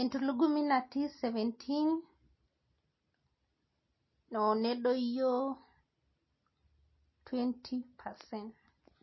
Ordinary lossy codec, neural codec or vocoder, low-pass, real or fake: MP3, 24 kbps; none; 7.2 kHz; real